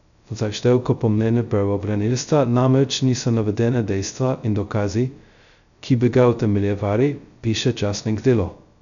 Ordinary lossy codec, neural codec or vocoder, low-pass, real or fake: none; codec, 16 kHz, 0.2 kbps, FocalCodec; 7.2 kHz; fake